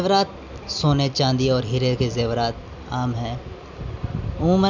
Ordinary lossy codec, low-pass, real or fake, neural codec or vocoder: none; 7.2 kHz; real; none